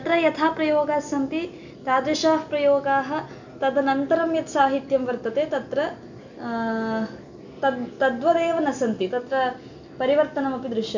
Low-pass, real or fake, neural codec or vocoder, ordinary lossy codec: 7.2 kHz; real; none; none